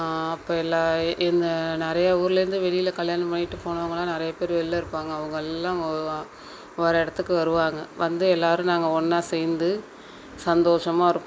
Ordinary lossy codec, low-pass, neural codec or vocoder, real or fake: none; none; none; real